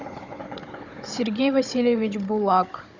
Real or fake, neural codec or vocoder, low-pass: fake; codec, 16 kHz, 16 kbps, FunCodec, trained on Chinese and English, 50 frames a second; 7.2 kHz